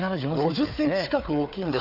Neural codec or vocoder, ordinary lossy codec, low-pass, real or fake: codec, 16 kHz, 4 kbps, FunCodec, trained on Chinese and English, 50 frames a second; none; 5.4 kHz; fake